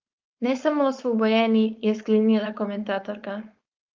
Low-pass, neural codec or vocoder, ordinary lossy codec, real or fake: 7.2 kHz; codec, 16 kHz, 4.8 kbps, FACodec; Opus, 24 kbps; fake